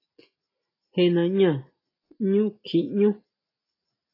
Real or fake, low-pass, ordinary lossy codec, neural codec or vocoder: real; 5.4 kHz; AAC, 24 kbps; none